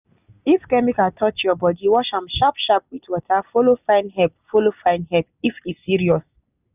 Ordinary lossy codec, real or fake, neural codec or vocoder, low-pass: none; real; none; 3.6 kHz